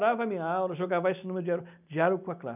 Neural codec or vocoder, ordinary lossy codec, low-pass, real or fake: none; none; 3.6 kHz; real